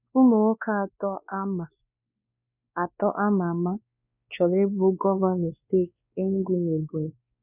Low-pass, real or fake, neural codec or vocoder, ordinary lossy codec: 3.6 kHz; fake; codec, 16 kHz, 2 kbps, X-Codec, WavLM features, trained on Multilingual LibriSpeech; none